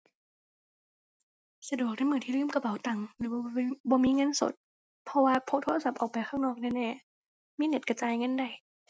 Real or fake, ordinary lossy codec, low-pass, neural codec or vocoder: real; none; none; none